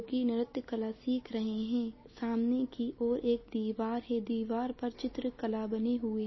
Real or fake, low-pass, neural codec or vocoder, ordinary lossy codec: real; 7.2 kHz; none; MP3, 24 kbps